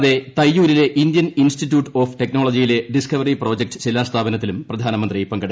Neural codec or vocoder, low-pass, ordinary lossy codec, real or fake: none; none; none; real